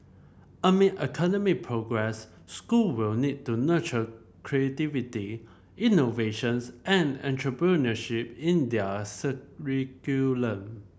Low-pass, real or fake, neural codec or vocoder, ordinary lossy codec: none; real; none; none